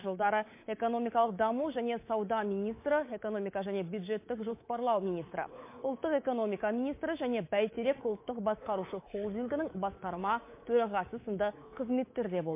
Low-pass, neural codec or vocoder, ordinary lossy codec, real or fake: 3.6 kHz; codec, 24 kHz, 3.1 kbps, DualCodec; MP3, 24 kbps; fake